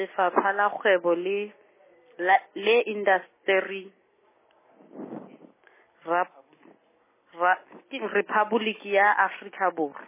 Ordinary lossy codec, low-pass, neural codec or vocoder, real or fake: MP3, 16 kbps; 3.6 kHz; none; real